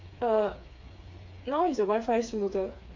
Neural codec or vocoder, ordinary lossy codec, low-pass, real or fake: codec, 16 kHz, 4 kbps, FreqCodec, smaller model; MP3, 48 kbps; 7.2 kHz; fake